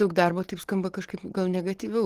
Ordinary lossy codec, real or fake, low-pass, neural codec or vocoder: Opus, 16 kbps; real; 14.4 kHz; none